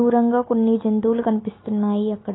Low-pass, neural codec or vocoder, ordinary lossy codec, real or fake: 7.2 kHz; none; AAC, 16 kbps; real